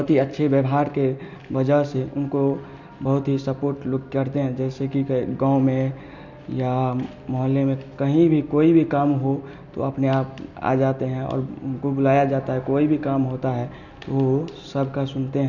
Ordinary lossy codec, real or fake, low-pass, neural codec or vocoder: none; real; 7.2 kHz; none